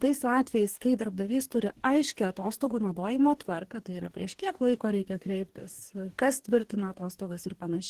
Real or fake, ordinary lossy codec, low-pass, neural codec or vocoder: fake; Opus, 16 kbps; 14.4 kHz; codec, 44.1 kHz, 2.6 kbps, DAC